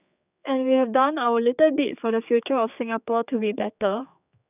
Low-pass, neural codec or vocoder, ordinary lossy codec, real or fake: 3.6 kHz; codec, 16 kHz, 4 kbps, X-Codec, HuBERT features, trained on general audio; none; fake